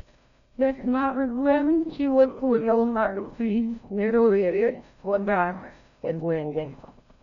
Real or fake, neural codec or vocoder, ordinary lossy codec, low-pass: fake; codec, 16 kHz, 0.5 kbps, FreqCodec, larger model; none; 7.2 kHz